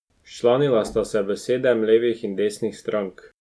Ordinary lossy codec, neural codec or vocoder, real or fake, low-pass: none; none; real; none